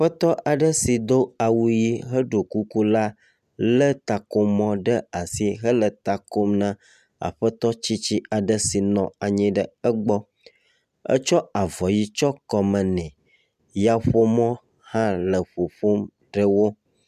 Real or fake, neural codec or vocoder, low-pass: real; none; 14.4 kHz